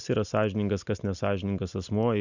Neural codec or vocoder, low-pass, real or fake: none; 7.2 kHz; real